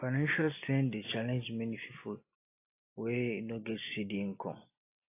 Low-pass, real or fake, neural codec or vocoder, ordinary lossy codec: 3.6 kHz; real; none; AAC, 24 kbps